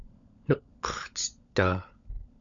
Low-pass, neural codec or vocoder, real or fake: 7.2 kHz; codec, 16 kHz, 16 kbps, FunCodec, trained on LibriTTS, 50 frames a second; fake